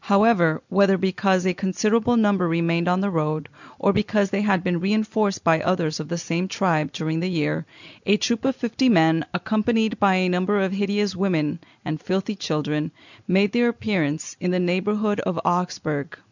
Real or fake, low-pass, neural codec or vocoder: real; 7.2 kHz; none